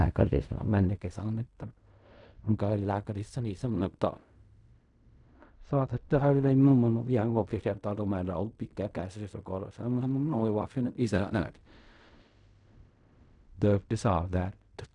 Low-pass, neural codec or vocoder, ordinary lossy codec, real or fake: 10.8 kHz; codec, 16 kHz in and 24 kHz out, 0.4 kbps, LongCat-Audio-Codec, fine tuned four codebook decoder; none; fake